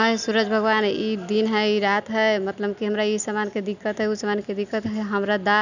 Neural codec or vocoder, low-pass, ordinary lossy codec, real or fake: none; 7.2 kHz; none; real